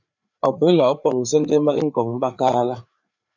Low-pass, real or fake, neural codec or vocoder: 7.2 kHz; fake; codec, 16 kHz, 4 kbps, FreqCodec, larger model